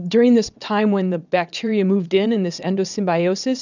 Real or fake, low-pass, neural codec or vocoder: fake; 7.2 kHz; vocoder, 22.05 kHz, 80 mel bands, WaveNeXt